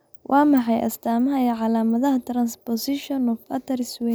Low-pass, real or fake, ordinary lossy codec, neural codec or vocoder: none; real; none; none